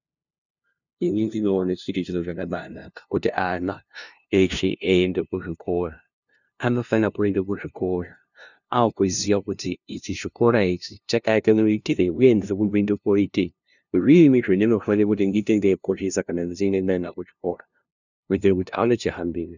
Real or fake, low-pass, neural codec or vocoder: fake; 7.2 kHz; codec, 16 kHz, 0.5 kbps, FunCodec, trained on LibriTTS, 25 frames a second